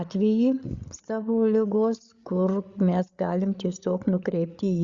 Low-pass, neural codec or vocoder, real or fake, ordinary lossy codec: 7.2 kHz; codec, 16 kHz, 8 kbps, FreqCodec, larger model; fake; Opus, 64 kbps